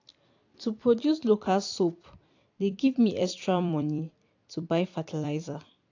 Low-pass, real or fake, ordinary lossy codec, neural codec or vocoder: 7.2 kHz; fake; AAC, 48 kbps; vocoder, 44.1 kHz, 128 mel bands every 256 samples, BigVGAN v2